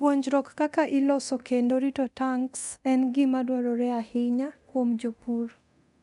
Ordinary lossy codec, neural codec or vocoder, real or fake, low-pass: none; codec, 24 kHz, 0.9 kbps, DualCodec; fake; 10.8 kHz